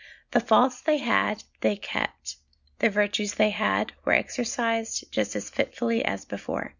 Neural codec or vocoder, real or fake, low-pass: none; real; 7.2 kHz